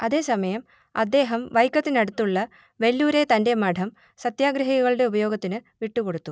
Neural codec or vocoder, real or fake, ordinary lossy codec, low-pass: none; real; none; none